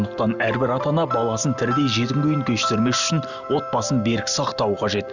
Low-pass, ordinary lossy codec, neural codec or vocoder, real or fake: 7.2 kHz; none; none; real